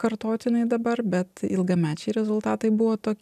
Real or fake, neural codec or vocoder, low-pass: real; none; 14.4 kHz